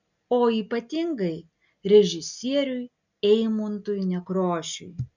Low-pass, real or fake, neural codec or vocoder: 7.2 kHz; real; none